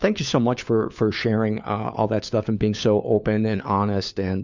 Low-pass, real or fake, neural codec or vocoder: 7.2 kHz; fake; codec, 16 kHz, 4 kbps, FunCodec, trained on LibriTTS, 50 frames a second